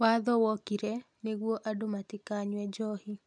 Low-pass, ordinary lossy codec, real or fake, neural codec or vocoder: 9.9 kHz; none; real; none